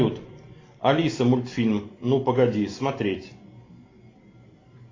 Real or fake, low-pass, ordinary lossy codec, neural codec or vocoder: real; 7.2 kHz; AAC, 32 kbps; none